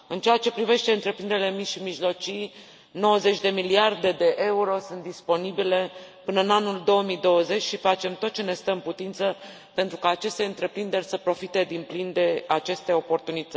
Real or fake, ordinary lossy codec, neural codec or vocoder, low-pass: real; none; none; none